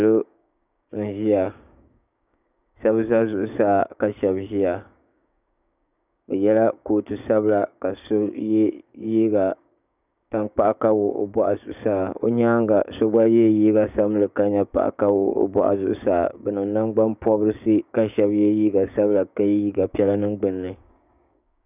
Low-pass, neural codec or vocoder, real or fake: 3.6 kHz; codec, 16 kHz, 6 kbps, DAC; fake